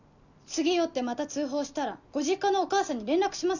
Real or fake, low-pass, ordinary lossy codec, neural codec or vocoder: real; 7.2 kHz; none; none